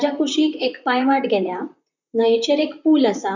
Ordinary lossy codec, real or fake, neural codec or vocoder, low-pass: none; fake; vocoder, 44.1 kHz, 128 mel bands, Pupu-Vocoder; 7.2 kHz